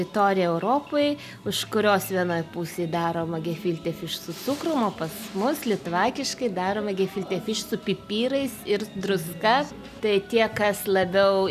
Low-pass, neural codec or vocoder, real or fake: 14.4 kHz; none; real